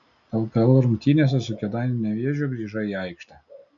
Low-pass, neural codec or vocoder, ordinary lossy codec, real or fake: 7.2 kHz; none; MP3, 96 kbps; real